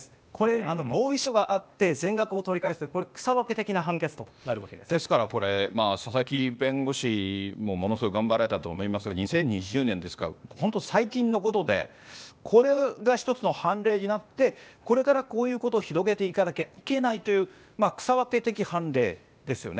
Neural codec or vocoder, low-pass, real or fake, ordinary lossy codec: codec, 16 kHz, 0.8 kbps, ZipCodec; none; fake; none